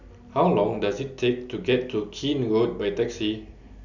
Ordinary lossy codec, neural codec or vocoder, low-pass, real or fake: none; none; 7.2 kHz; real